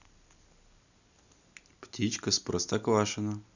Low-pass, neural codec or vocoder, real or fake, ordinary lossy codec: 7.2 kHz; none; real; none